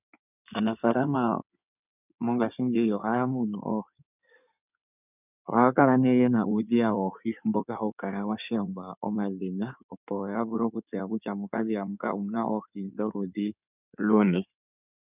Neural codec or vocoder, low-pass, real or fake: codec, 16 kHz in and 24 kHz out, 2.2 kbps, FireRedTTS-2 codec; 3.6 kHz; fake